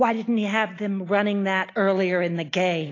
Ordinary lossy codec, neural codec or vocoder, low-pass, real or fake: AAC, 32 kbps; none; 7.2 kHz; real